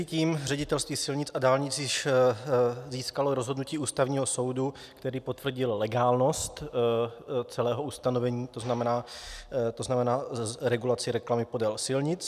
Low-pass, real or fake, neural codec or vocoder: 14.4 kHz; real; none